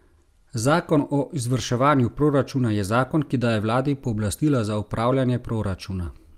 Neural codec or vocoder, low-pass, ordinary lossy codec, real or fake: none; 14.4 kHz; Opus, 32 kbps; real